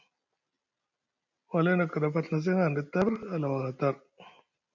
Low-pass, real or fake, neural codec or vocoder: 7.2 kHz; real; none